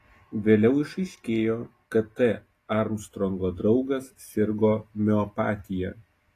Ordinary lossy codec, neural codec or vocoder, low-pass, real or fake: AAC, 48 kbps; none; 14.4 kHz; real